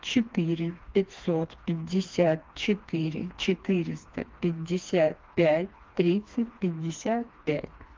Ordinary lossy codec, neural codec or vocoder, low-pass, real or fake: Opus, 32 kbps; codec, 16 kHz, 2 kbps, FreqCodec, smaller model; 7.2 kHz; fake